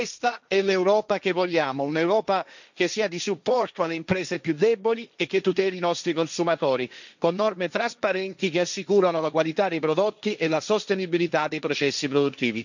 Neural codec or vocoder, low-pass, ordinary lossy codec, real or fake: codec, 16 kHz, 1.1 kbps, Voila-Tokenizer; 7.2 kHz; none; fake